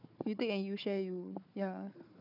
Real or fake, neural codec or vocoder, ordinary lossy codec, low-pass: fake; codec, 16 kHz, 4 kbps, FunCodec, trained on Chinese and English, 50 frames a second; none; 5.4 kHz